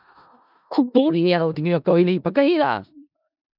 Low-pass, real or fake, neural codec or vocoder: 5.4 kHz; fake; codec, 16 kHz in and 24 kHz out, 0.4 kbps, LongCat-Audio-Codec, four codebook decoder